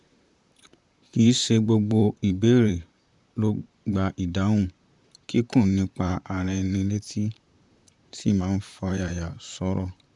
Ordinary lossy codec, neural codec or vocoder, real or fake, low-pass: none; vocoder, 44.1 kHz, 128 mel bands, Pupu-Vocoder; fake; 10.8 kHz